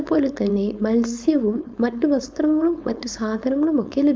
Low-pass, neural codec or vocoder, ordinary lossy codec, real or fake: none; codec, 16 kHz, 4.8 kbps, FACodec; none; fake